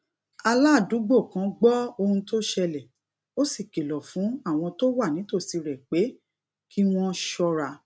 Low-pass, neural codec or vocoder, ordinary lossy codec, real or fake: none; none; none; real